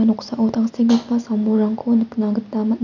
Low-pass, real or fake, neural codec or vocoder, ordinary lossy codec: 7.2 kHz; real; none; none